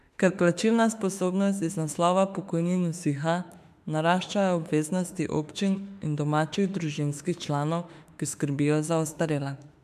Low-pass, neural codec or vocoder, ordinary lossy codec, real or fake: 14.4 kHz; autoencoder, 48 kHz, 32 numbers a frame, DAC-VAE, trained on Japanese speech; MP3, 96 kbps; fake